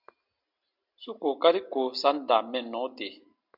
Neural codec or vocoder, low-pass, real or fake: none; 5.4 kHz; real